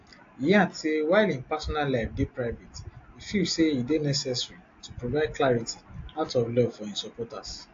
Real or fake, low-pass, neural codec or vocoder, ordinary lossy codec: real; 7.2 kHz; none; AAC, 48 kbps